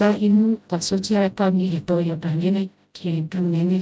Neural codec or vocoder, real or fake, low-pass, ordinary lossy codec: codec, 16 kHz, 0.5 kbps, FreqCodec, smaller model; fake; none; none